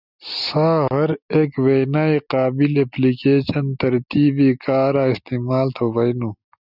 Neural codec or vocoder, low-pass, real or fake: none; 5.4 kHz; real